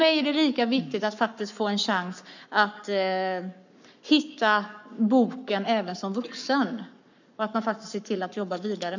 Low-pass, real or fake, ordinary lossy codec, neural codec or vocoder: 7.2 kHz; fake; none; codec, 44.1 kHz, 7.8 kbps, Pupu-Codec